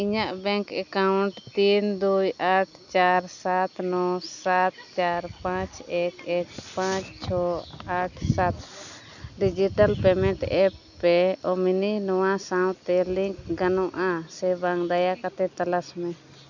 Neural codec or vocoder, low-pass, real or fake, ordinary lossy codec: none; 7.2 kHz; real; none